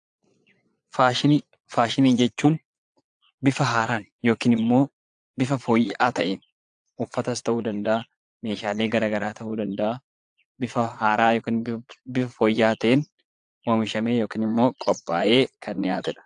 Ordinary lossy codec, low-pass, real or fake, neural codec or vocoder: AAC, 64 kbps; 9.9 kHz; fake; vocoder, 22.05 kHz, 80 mel bands, Vocos